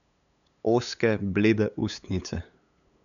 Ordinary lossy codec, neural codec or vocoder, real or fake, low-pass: none; codec, 16 kHz, 8 kbps, FunCodec, trained on LibriTTS, 25 frames a second; fake; 7.2 kHz